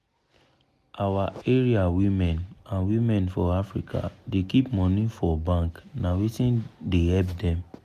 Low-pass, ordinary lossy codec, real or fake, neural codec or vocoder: 14.4 kHz; none; real; none